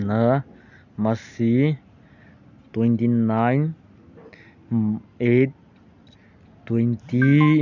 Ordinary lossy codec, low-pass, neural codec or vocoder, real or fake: none; 7.2 kHz; none; real